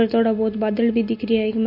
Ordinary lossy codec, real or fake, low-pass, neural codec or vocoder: MP3, 32 kbps; real; 5.4 kHz; none